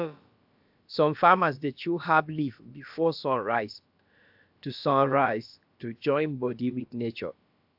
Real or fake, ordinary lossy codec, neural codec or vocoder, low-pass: fake; AAC, 48 kbps; codec, 16 kHz, about 1 kbps, DyCAST, with the encoder's durations; 5.4 kHz